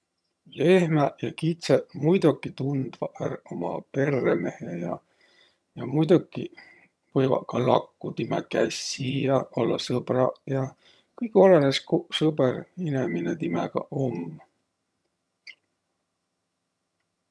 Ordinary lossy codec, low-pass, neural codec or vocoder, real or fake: none; none; vocoder, 22.05 kHz, 80 mel bands, HiFi-GAN; fake